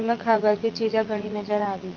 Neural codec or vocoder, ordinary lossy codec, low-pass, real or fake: vocoder, 44.1 kHz, 80 mel bands, Vocos; Opus, 24 kbps; 7.2 kHz; fake